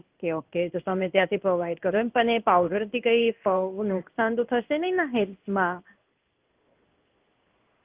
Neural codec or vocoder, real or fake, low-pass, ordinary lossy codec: codec, 16 kHz in and 24 kHz out, 1 kbps, XY-Tokenizer; fake; 3.6 kHz; Opus, 24 kbps